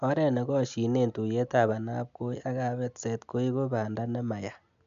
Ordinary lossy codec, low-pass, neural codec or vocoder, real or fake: none; 7.2 kHz; none; real